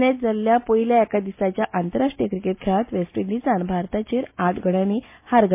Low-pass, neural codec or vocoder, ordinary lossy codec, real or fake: 3.6 kHz; none; MP3, 24 kbps; real